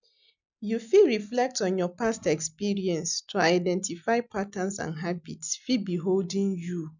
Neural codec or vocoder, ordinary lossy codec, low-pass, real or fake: none; none; 7.2 kHz; real